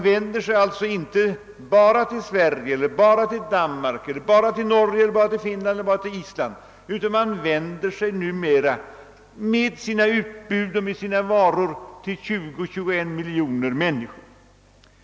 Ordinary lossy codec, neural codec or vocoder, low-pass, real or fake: none; none; none; real